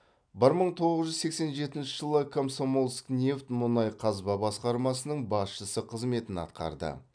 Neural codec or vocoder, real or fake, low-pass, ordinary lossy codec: none; real; 9.9 kHz; none